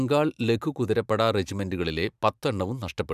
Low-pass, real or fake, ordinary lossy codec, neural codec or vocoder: 14.4 kHz; fake; none; vocoder, 44.1 kHz, 128 mel bands every 512 samples, BigVGAN v2